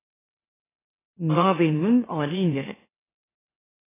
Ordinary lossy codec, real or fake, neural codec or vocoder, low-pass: AAC, 16 kbps; fake; autoencoder, 44.1 kHz, a latent of 192 numbers a frame, MeloTTS; 3.6 kHz